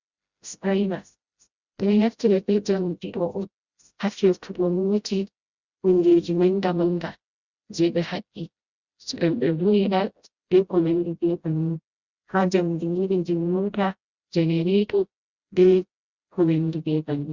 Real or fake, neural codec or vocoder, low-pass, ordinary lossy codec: fake; codec, 16 kHz, 0.5 kbps, FreqCodec, smaller model; 7.2 kHz; Opus, 64 kbps